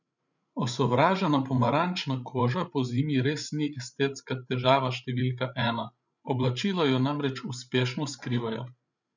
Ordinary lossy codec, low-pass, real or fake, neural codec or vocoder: none; 7.2 kHz; fake; codec, 16 kHz, 8 kbps, FreqCodec, larger model